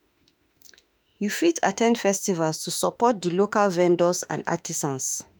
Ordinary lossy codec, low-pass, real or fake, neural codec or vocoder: none; none; fake; autoencoder, 48 kHz, 32 numbers a frame, DAC-VAE, trained on Japanese speech